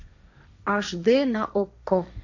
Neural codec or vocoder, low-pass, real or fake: codec, 16 kHz, 1.1 kbps, Voila-Tokenizer; 7.2 kHz; fake